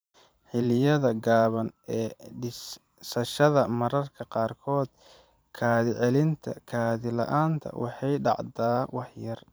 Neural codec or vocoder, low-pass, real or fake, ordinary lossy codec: vocoder, 44.1 kHz, 128 mel bands every 512 samples, BigVGAN v2; none; fake; none